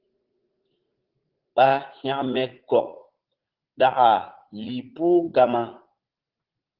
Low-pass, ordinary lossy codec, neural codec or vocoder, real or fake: 5.4 kHz; Opus, 16 kbps; vocoder, 44.1 kHz, 80 mel bands, Vocos; fake